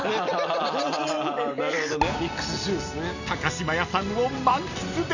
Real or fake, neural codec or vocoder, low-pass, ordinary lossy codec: real; none; 7.2 kHz; none